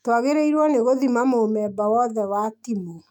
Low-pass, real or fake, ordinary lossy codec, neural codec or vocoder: 19.8 kHz; fake; none; autoencoder, 48 kHz, 128 numbers a frame, DAC-VAE, trained on Japanese speech